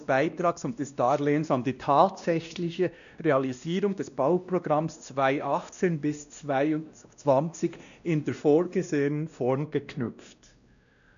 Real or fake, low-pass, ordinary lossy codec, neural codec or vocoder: fake; 7.2 kHz; none; codec, 16 kHz, 1 kbps, X-Codec, WavLM features, trained on Multilingual LibriSpeech